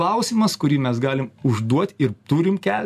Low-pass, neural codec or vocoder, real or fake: 14.4 kHz; none; real